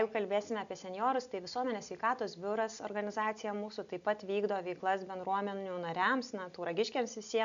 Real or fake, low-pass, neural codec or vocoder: real; 7.2 kHz; none